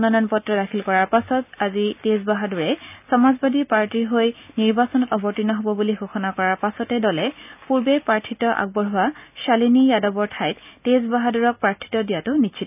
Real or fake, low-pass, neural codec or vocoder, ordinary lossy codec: real; 3.6 kHz; none; none